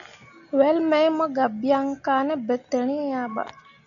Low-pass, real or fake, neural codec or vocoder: 7.2 kHz; real; none